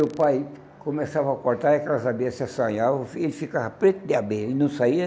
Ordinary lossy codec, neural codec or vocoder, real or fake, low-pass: none; none; real; none